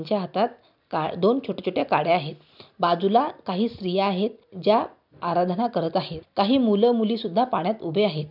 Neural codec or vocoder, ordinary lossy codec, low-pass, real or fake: none; none; 5.4 kHz; real